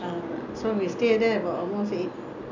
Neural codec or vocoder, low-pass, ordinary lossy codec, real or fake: none; 7.2 kHz; none; real